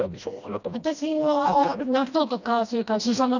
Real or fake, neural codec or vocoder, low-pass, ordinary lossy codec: fake; codec, 16 kHz, 1 kbps, FreqCodec, smaller model; 7.2 kHz; none